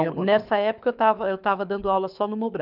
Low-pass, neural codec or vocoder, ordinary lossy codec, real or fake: 5.4 kHz; codec, 24 kHz, 6 kbps, HILCodec; none; fake